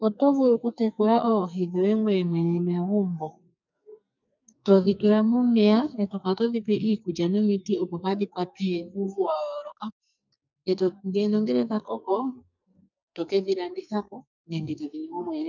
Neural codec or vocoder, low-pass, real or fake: codec, 44.1 kHz, 2.6 kbps, SNAC; 7.2 kHz; fake